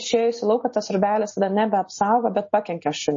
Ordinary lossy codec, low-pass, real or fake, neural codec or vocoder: MP3, 32 kbps; 7.2 kHz; real; none